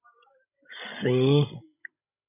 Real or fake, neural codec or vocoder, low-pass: fake; codec, 16 kHz, 16 kbps, FreqCodec, larger model; 3.6 kHz